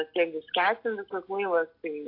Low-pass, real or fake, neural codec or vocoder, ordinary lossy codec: 5.4 kHz; real; none; AAC, 32 kbps